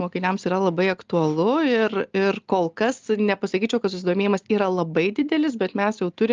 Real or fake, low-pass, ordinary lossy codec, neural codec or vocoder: real; 7.2 kHz; Opus, 24 kbps; none